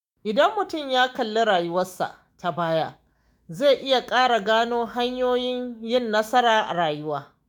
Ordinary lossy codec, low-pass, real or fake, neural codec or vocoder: none; 19.8 kHz; fake; autoencoder, 48 kHz, 128 numbers a frame, DAC-VAE, trained on Japanese speech